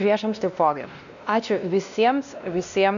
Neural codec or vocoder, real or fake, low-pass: codec, 16 kHz, 1 kbps, X-Codec, WavLM features, trained on Multilingual LibriSpeech; fake; 7.2 kHz